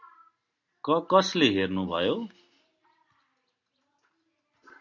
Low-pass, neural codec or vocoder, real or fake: 7.2 kHz; none; real